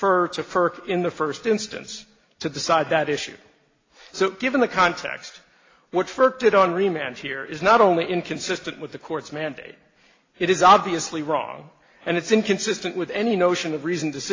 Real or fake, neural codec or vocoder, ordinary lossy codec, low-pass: real; none; AAC, 32 kbps; 7.2 kHz